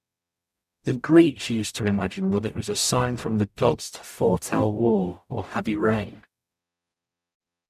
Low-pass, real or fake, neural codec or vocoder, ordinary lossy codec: 14.4 kHz; fake; codec, 44.1 kHz, 0.9 kbps, DAC; none